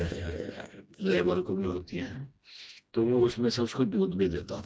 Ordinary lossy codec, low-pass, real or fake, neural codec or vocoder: none; none; fake; codec, 16 kHz, 1 kbps, FreqCodec, smaller model